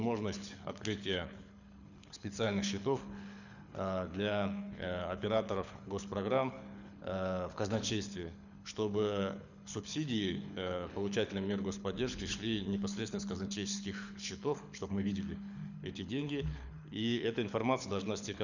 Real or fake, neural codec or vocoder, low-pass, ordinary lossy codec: fake; codec, 24 kHz, 6 kbps, HILCodec; 7.2 kHz; none